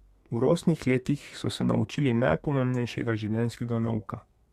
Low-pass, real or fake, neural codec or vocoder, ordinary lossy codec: 14.4 kHz; fake; codec, 32 kHz, 1.9 kbps, SNAC; Opus, 64 kbps